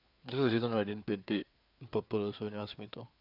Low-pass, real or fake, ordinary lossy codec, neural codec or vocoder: 5.4 kHz; fake; none; codec, 16 kHz, 4 kbps, FreqCodec, larger model